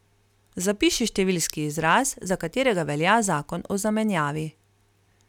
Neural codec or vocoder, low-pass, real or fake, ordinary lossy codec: none; 19.8 kHz; real; none